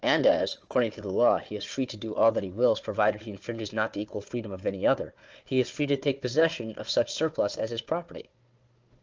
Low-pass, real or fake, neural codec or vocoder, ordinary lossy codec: 7.2 kHz; fake; codec, 16 kHz, 4 kbps, FunCodec, trained on Chinese and English, 50 frames a second; Opus, 24 kbps